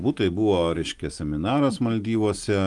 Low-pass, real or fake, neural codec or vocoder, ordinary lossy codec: 10.8 kHz; real; none; Opus, 32 kbps